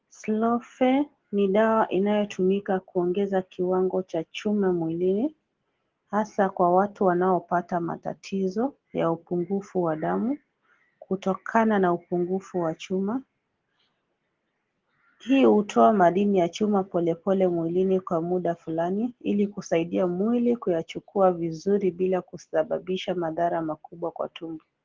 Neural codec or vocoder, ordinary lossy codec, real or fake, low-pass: none; Opus, 16 kbps; real; 7.2 kHz